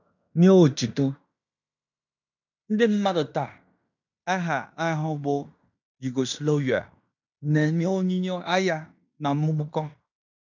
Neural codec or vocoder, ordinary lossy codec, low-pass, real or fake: codec, 16 kHz in and 24 kHz out, 0.9 kbps, LongCat-Audio-Codec, fine tuned four codebook decoder; none; 7.2 kHz; fake